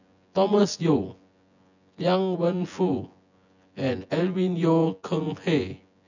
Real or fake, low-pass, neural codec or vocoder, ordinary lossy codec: fake; 7.2 kHz; vocoder, 24 kHz, 100 mel bands, Vocos; none